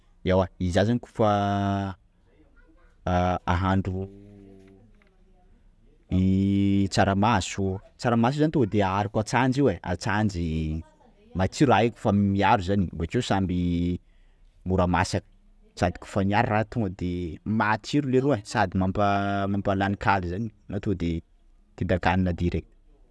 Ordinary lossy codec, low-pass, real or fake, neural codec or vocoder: none; none; real; none